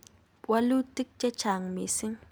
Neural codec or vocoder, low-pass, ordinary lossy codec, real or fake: none; none; none; real